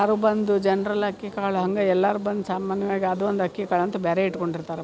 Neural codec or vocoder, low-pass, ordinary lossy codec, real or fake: none; none; none; real